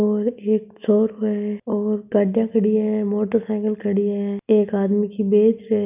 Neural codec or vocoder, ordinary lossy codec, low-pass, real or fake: none; none; 3.6 kHz; real